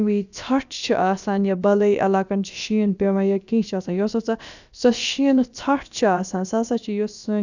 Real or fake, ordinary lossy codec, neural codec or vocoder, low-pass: fake; none; codec, 16 kHz, about 1 kbps, DyCAST, with the encoder's durations; 7.2 kHz